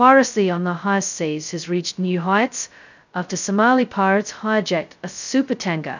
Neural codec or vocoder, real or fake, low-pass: codec, 16 kHz, 0.2 kbps, FocalCodec; fake; 7.2 kHz